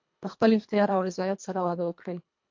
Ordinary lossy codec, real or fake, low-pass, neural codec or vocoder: MP3, 48 kbps; fake; 7.2 kHz; codec, 24 kHz, 1.5 kbps, HILCodec